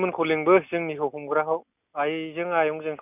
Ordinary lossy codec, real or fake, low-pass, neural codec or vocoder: none; real; 3.6 kHz; none